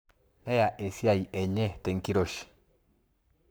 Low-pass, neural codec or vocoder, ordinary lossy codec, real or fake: none; codec, 44.1 kHz, 7.8 kbps, Pupu-Codec; none; fake